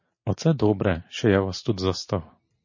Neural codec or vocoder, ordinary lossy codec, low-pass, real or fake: none; MP3, 32 kbps; 7.2 kHz; real